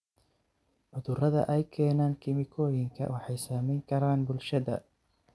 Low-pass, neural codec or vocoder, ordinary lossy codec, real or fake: none; none; none; real